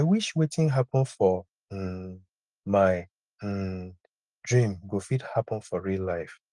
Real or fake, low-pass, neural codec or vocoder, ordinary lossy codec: real; 10.8 kHz; none; Opus, 24 kbps